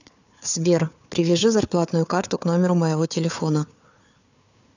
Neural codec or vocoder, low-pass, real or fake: codec, 16 kHz, 4 kbps, FunCodec, trained on Chinese and English, 50 frames a second; 7.2 kHz; fake